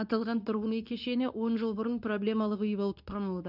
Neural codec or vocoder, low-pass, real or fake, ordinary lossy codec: codec, 24 kHz, 0.9 kbps, WavTokenizer, medium speech release version 2; 5.4 kHz; fake; none